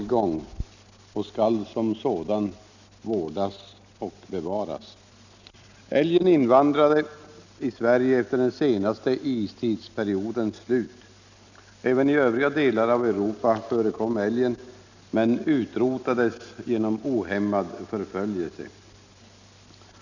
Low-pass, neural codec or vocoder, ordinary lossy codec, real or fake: 7.2 kHz; none; none; real